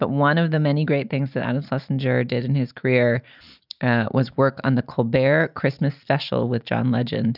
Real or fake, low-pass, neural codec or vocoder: real; 5.4 kHz; none